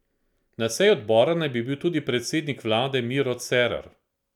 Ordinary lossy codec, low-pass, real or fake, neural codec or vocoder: none; 19.8 kHz; real; none